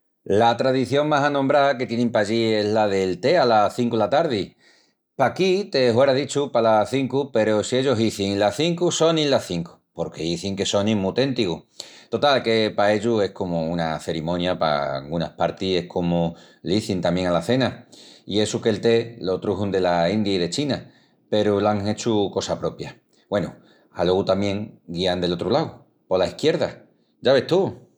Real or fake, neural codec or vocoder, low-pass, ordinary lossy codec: real; none; 19.8 kHz; none